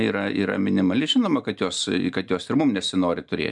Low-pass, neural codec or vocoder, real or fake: 10.8 kHz; none; real